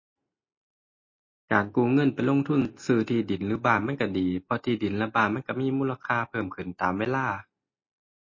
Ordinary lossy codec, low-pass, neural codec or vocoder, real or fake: MP3, 32 kbps; 7.2 kHz; none; real